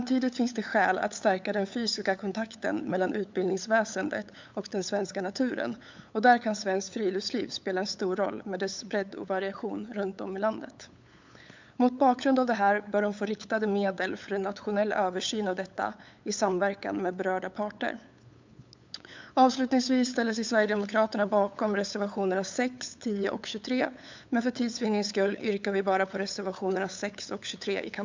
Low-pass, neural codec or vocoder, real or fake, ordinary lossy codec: 7.2 kHz; codec, 16 kHz, 8 kbps, FunCodec, trained on LibriTTS, 25 frames a second; fake; MP3, 64 kbps